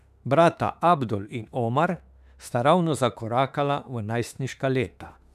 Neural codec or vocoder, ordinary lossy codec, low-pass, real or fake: autoencoder, 48 kHz, 32 numbers a frame, DAC-VAE, trained on Japanese speech; none; 14.4 kHz; fake